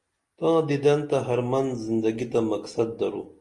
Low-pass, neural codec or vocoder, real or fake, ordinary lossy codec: 10.8 kHz; none; real; Opus, 24 kbps